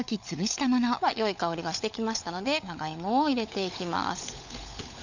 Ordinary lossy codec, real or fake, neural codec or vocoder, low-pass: none; fake; codec, 16 kHz, 16 kbps, FunCodec, trained on LibriTTS, 50 frames a second; 7.2 kHz